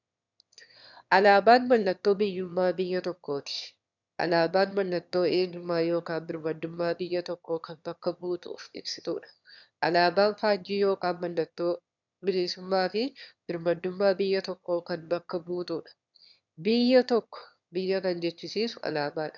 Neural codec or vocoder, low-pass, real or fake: autoencoder, 22.05 kHz, a latent of 192 numbers a frame, VITS, trained on one speaker; 7.2 kHz; fake